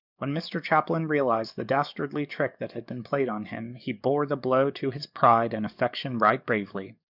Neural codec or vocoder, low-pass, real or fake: codec, 44.1 kHz, 7.8 kbps, DAC; 5.4 kHz; fake